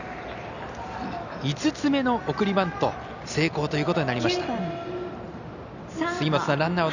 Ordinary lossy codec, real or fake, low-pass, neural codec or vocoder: none; real; 7.2 kHz; none